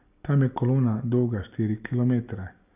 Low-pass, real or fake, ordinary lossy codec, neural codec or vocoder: 3.6 kHz; real; none; none